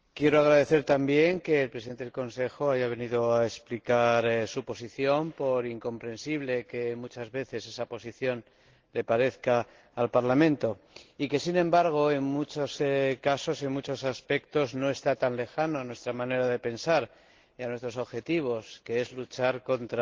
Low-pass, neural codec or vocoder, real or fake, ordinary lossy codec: 7.2 kHz; none; real; Opus, 16 kbps